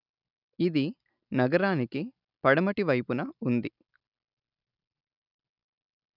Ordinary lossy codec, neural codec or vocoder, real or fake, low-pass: none; none; real; 5.4 kHz